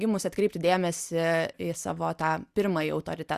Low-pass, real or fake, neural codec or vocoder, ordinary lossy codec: 14.4 kHz; real; none; Opus, 64 kbps